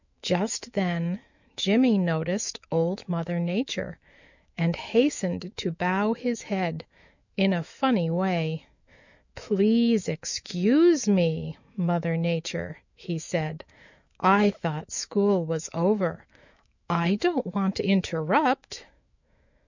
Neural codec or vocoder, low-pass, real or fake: autoencoder, 48 kHz, 128 numbers a frame, DAC-VAE, trained on Japanese speech; 7.2 kHz; fake